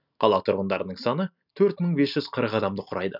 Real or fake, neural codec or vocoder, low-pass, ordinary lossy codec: real; none; 5.4 kHz; none